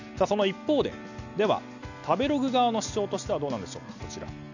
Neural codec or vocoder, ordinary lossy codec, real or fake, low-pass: none; none; real; 7.2 kHz